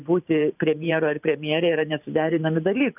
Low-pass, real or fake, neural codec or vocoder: 3.6 kHz; fake; vocoder, 44.1 kHz, 128 mel bands every 512 samples, BigVGAN v2